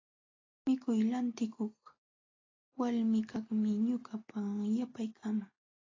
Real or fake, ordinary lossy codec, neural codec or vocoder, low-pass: real; AAC, 32 kbps; none; 7.2 kHz